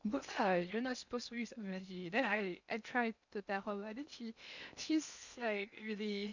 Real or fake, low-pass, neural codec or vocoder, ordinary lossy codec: fake; 7.2 kHz; codec, 16 kHz in and 24 kHz out, 0.8 kbps, FocalCodec, streaming, 65536 codes; none